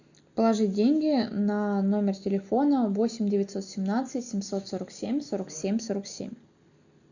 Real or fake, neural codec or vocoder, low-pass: real; none; 7.2 kHz